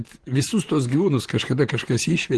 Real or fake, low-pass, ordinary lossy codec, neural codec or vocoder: fake; 10.8 kHz; Opus, 16 kbps; vocoder, 44.1 kHz, 128 mel bands, Pupu-Vocoder